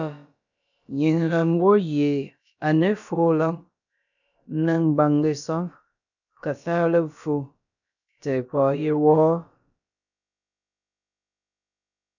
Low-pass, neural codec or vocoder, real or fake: 7.2 kHz; codec, 16 kHz, about 1 kbps, DyCAST, with the encoder's durations; fake